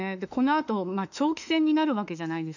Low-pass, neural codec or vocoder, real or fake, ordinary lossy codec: 7.2 kHz; autoencoder, 48 kHz, 32 numbers a frame, DAC-VAE, trained on Japanese speech; fake; none